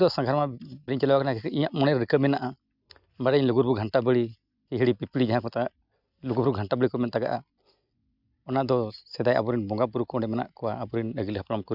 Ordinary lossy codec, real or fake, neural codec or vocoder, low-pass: none; real; none; 5.4 kHz